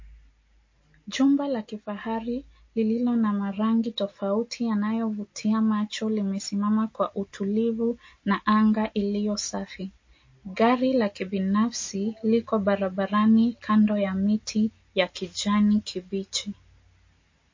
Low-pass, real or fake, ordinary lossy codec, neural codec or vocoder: 7.2 kHz; real; MP3, 32 kbps; none